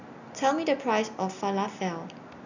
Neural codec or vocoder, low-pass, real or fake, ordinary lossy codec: none; 7.2 kHz; real; none